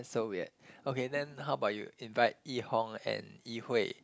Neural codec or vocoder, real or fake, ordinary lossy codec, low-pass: none; real; none; none